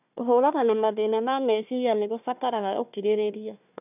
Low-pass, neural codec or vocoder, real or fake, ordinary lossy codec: 3.6 kHz; codec, 16 kHz, 1 kbps, FunCodec, trained on Chinese and English, 50 frames a second; fake; none